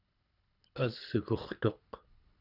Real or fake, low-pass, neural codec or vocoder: fake; 5.4 kHz; codec, 24 kHz, 3 kbps, HILCodec